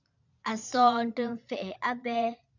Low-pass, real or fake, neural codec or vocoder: 7.2 kHz; fake; vocoder, 22.05 kHz, 80 mel bands, Vocos